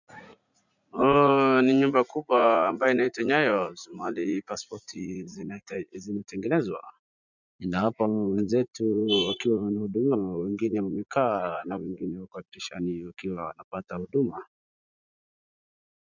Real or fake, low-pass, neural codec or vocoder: fake; 7.2 kHz; vocoder, 44.1 kHz, 80 mel bands, Vocos